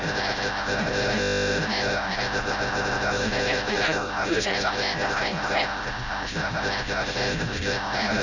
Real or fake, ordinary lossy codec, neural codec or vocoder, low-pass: fake; none; codec, 16 kHz, 0.5 kbps, FreqCodec, smaller model; 7.2 kHz